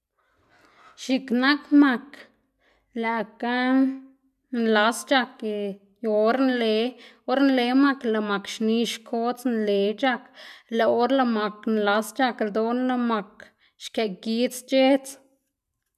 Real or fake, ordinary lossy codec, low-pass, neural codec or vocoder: real; none; 14.4 kHz; none